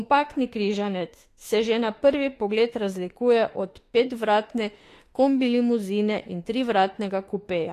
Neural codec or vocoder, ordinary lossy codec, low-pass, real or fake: autoencoder, 48 kHz, 32 numbers a frame, DAC-VAE, trained on Japanese speech; AAC, 48 kbps; 14.4 kHz; fake